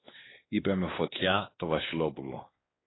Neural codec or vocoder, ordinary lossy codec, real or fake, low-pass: codec, 16 kHz, 2 kbps, X-Codec, WavLM features, trained on Multilingual LibriSpeech; AAC, 16 kbps; fake; 7.2 kHz